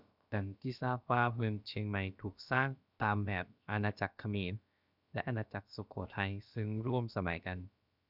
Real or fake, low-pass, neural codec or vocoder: fake; 5.4 kHz; codec, 16 kHz, about 1 kbps, DyCAST, with the encoder's durations